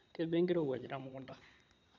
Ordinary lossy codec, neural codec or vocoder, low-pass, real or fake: none; vocoder, 22.05 kHz, 80 mel bands, Vocos; 7.2 kHz; fake